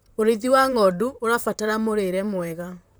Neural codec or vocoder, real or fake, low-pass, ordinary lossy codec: vocoder, 44.1 kHz, 128 mel bands, Pupu-Vocoder; fake; none; none